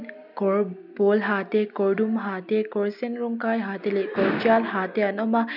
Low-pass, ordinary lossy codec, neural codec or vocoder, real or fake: 5.4 kHz; none; none; real